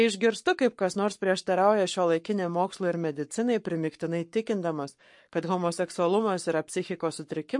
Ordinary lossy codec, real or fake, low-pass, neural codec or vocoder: MP3, 48 kbps; fake; 10.8 kHz; codec, 44.1 kHz, 7.8 kbps, Pupu-Codec